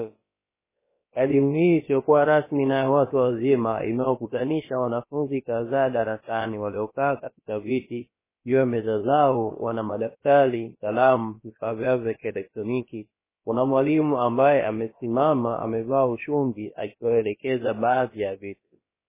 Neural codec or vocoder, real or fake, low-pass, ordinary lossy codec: codec, 16 kHz, about 1 kbps, DyCAST, with the encoder's durations; fake; 3.6 kHz; MP3, 16 kbps